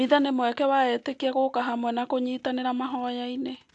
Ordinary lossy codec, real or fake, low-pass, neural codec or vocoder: AAC, 64 kbps; real; 10.8 kHz; none